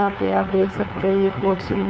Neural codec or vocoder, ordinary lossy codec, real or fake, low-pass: codec, 16 kHz, 2 kbps, FunCodec, trained on LibriTTS, 25 frames a second; none; fake; none